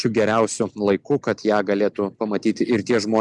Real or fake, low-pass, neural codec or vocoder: real; 10.8 kHz; none